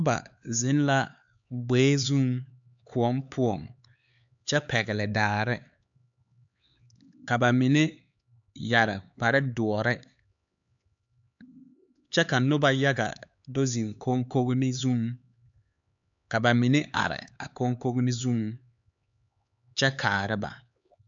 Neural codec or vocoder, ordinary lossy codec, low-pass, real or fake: codec, 16 kHz, 4 kbps, X-Codec, HuBERT features, trained on LibriSpeech; AAC, 64 kbps; 7.2 kHz; fake